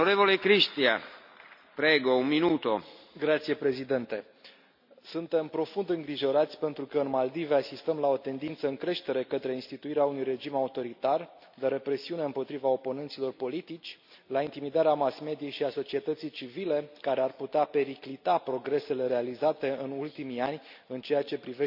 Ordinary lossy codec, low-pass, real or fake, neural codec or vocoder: none; 5.4 kHz; real; none